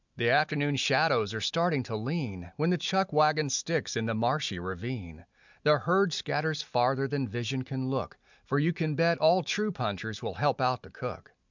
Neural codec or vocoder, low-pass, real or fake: none; 7.2 kHz; real